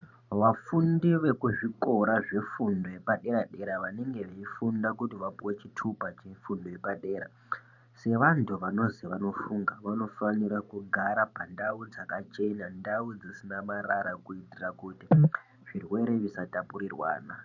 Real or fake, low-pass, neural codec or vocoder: fake; 7.2 kHz; vocoder, 44.1 kHz, 128 mel bands every 512 samples, BigVGAN v2